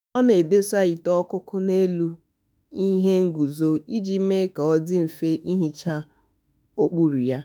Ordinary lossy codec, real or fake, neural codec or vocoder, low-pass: none; fake; autoencoder, 48 kHz, 32 numbers a frame, DAC-VAE, trained on Japanese speech; none